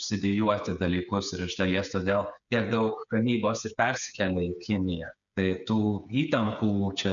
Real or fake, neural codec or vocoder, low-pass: fake; codec, 16 kHz, 8 kbps, FreqCodec, smaller model; 7.2 kHz